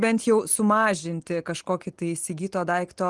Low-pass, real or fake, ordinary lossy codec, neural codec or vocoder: 10.8 kHz; real; Opus, 24 kbps; none